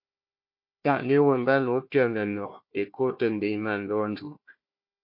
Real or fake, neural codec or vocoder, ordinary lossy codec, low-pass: fake; codec, 16 kHz, 1 kbps, FunCodec, trained on Chinese and English, 50 frames a second; MP3, 32 kbps; 5.4 kHz